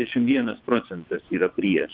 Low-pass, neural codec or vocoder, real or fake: 5.4 kHz; vocoder, 22.05 kHz, 80 mel bands, WaveNeXt; fake